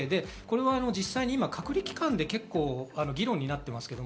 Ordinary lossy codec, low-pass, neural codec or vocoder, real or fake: none; none; none; real